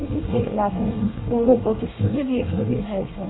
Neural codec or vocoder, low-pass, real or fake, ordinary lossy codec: codec, 24 kHz, 1 kbps, SNAC; 7.2 kHz; fake; AAC, 16 kbps